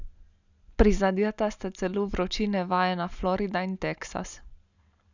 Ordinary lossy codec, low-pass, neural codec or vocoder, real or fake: none; 7.2 kHz; none; real